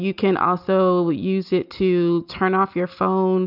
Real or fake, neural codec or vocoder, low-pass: real; none; 5.4 kHz